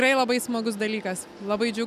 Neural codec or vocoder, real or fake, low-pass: none; real; 14.4 kHz